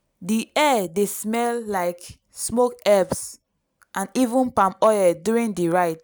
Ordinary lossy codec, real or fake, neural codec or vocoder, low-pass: none; real; none; none